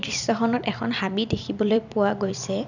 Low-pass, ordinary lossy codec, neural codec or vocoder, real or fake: 7.2 kHz; none; vocoder, 44.1 kHz, 128 mel bands every 256 samples, BigVGAN v2; fake